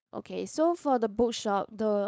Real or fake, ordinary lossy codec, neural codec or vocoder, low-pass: fake; none; codec, 16 kHz, 4.8 kbps, FACodec; none